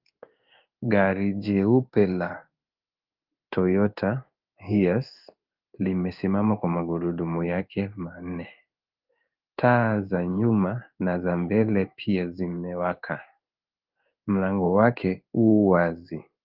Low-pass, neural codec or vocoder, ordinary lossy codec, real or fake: 5.4 kHz; codec, 16 kHz in and 24 kHz out, 1 kbps, XY-Tokenizer; Opus, 32 kbps; fake